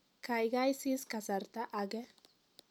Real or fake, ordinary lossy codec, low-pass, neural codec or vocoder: real; none; 19.8 kHz; none